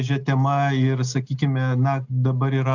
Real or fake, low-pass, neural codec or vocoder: real; 7.2 kHz; none